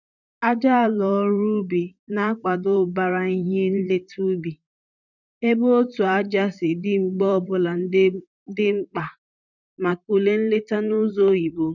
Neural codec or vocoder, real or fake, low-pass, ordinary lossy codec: vocoder, 44.1 kHz, 128 mel bands, Pupu-Vocoder; fake; 7.2 kHz; none